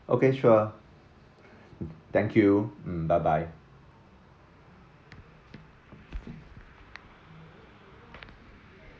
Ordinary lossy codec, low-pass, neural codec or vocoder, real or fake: none; none; none; real